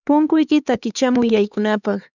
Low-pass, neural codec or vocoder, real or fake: 7.2 kHz; codec, 16 kHz, 4 kbps, X-Codec, HuBERT features, trained on LibriSpeech; fake